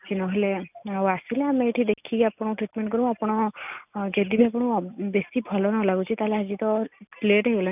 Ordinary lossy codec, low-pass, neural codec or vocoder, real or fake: none; 3.6 kHz; none; real